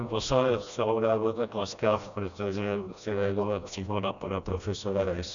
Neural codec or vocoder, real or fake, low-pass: codec, 16 kHz, 1 kbps, FreqCodec, smaller model; fake; 7.2 kHz